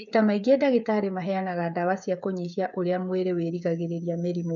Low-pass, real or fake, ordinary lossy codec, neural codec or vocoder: 7.2 kHz; fake; none; codec, 16 kHz, 8 kbps, FreqCodec, smaller model